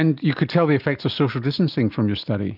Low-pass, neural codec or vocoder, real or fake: 5.4 kHz; none; real